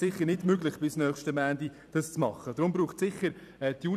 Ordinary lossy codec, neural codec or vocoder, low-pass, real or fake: none; vocoder, 44.1 kHz, 128 mel bands every 256 samples, BigVGAN v2; 14.4 kHz; fake